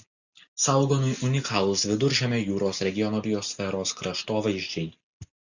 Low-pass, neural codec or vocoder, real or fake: 7.2 kHz; none; real